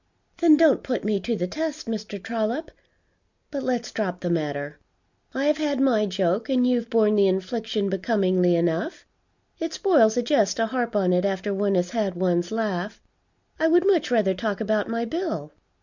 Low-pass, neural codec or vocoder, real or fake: 7.2 kHz; none; real